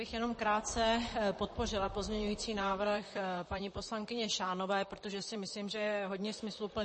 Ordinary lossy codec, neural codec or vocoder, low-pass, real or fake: MP3, 32 kbps; vocoder, 44.1 kHz, 128 mel bands, Pupu-Vocoder; 10.8 kHz; fake